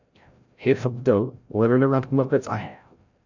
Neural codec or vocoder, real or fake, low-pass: codec, 16 kHz, 0.5 kbps, FreqCodec, larger model; fake; 7.2 kHz